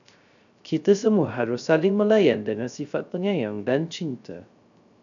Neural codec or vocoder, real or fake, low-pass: codec, 16 kHz, 0.3 kbps, FocalCodec; fake; 7.2 kHz